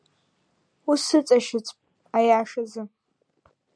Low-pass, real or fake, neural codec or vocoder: 9.9 kHz; real; none